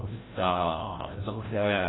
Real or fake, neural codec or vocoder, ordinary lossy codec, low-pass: fake; codec, 16 kHz, 0.5 kbps, FreqCodec, larger model; AAC, 16 kbps; 7.2 kHz